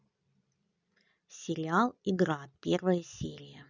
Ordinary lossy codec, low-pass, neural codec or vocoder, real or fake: none; 7.2 kHz; none; real